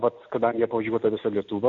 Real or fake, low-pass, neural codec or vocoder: real; 7.2 kHz; none